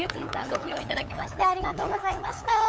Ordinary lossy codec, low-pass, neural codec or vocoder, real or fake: none; none; codec, 16 kHz, 8 kbps, FunCodec, trained on LibriTTS, 25 frames a second; fake